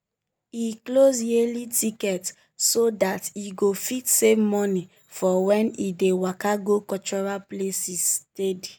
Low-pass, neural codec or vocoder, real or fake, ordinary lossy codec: none; none; real; none